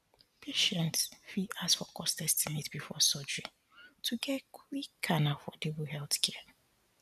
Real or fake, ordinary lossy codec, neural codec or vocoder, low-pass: real; none; none; 14.4 kHz